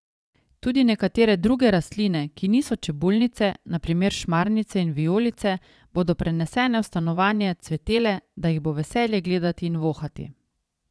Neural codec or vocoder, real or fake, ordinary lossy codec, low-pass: vocoder, 22.05 kHz, 80 mel bands, Vocos; fake; none; none